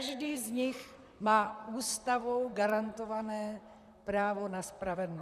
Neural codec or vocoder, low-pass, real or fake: vocoder, 44.1 kHz, 128 mel bands, Pupu-Vocoder; 14.4 kHz; fake